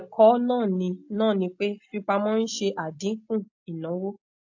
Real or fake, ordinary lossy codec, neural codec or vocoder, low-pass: real; AAC, 48 kbps; none; 7.2 kHz